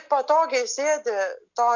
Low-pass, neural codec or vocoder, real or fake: 7.2 kHz; none; real